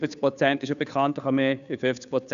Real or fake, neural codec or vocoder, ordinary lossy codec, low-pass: fake; codec, 16 kHz, 2 kbps, FunCodec, trained on Chinese and English, 25 frames a second; none; 7.2 kHz